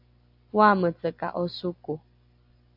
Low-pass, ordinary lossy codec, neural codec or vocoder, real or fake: 5.4 kHz; AAC, 48 kbps; none; real